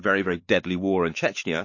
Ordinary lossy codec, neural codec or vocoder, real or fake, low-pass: MP3, 32 kbps; codec, 16 kHz, 4 kbps, X-Codec, WavLM features, trained on Multilingual LibriSpeech; fake; 7.2 kHz